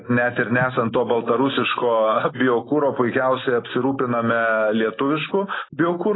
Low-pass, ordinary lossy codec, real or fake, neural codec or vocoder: 7.2 kHz; AAC, 16 kbps; real; none